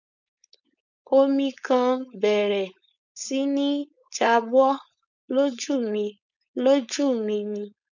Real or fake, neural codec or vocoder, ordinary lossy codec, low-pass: fake; codec, 16 kHz, 4.8 kbps, FACodec; none; 7.2 kHz